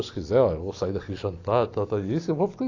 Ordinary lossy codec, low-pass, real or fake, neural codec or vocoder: AAC, 48 kbps; 7.2 kHz; real; none